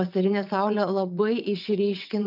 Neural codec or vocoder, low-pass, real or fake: vocoder, 22.05 kHz, 80 mel bands, WaveNeXt; 5.4 kHz; fake